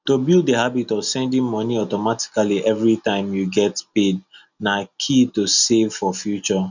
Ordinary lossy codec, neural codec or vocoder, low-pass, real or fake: none; none; 7.2 kHz; real